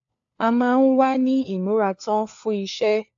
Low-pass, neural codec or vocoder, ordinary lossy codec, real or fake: 7.2 kHz; codec, 16 kHz, 4 kbps, FunCodec, trained on LibriTTS, 50 frames a second; AAC, 64 kbps; fake